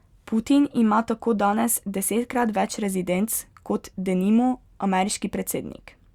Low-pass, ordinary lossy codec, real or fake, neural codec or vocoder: 19.8 kHz; Opus, 64 kbps; real; none